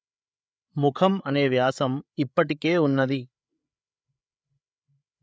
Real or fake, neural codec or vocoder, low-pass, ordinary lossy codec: fake; codec, 16 kHz, 8 kbps, FreqCodec, larger model; none; none